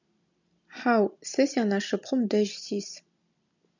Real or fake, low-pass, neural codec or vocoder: real; 7.2 kHz; none